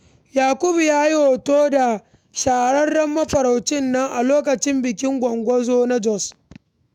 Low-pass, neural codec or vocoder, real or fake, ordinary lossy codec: 19.8 kHz; autoencoder, 48 kHz, 128 numbers a frame, DAC-VAE, trained on Japanese speech; fake; none